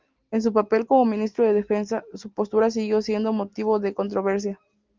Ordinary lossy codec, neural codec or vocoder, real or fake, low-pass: Opus, 24 kbps; none; real; 7.2 kHz